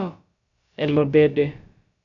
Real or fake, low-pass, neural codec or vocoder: fake; 7.2 kHz; codec, 16 kHz, about 1 kbps, DyCAST, with the encoder's durations